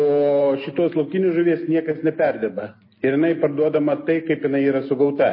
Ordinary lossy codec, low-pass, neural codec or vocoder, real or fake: MP3, 24 kbps; 5.4 kHz; none; real